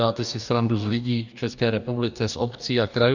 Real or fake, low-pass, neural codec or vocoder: fake; 7.2 kHz; codec, 44.1 kHz, 2.6 kbps, DAC